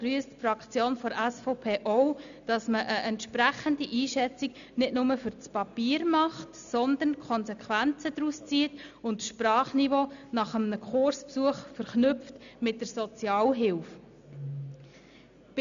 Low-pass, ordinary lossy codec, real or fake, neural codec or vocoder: 7.2 kHz; none; real; none